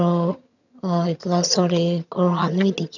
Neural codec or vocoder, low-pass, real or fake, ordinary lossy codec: vocoder, 22.05 kHz, 80 mel bands, HiFi-GAN; 7.2 kHz; fake; none